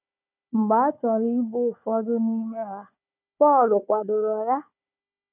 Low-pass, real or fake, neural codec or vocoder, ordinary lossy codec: 3.6 kHz; fake; codec, 16 kHz, 4 kbps, FunCodec, trained on Chinese and English, 50 frames a second; none